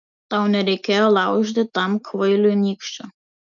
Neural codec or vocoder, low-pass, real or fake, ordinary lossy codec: none; 7.2 kHz; real; MP3, 96 kbps